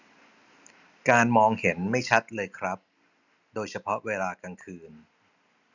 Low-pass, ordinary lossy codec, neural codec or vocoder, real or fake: 7.2 kHz; none; none; real